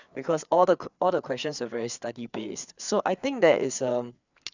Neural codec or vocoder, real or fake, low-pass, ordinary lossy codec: codec, 16 kHz, 4 kbps, FreqCodec, larger model; fake; 7.2 kHz; none